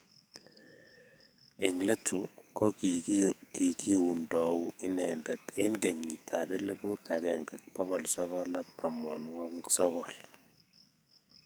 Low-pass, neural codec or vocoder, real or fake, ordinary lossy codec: none; codec, 44.1 kHz, 2.6 kbps, SNAC; fake; none